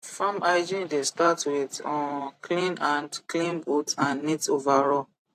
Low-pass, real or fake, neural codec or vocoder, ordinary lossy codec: 14.4 kHz; fake; vocoder, 44.1 kHz, 128 mel bands every 512 samples, BigVGAN v2; AAC, 64 kbps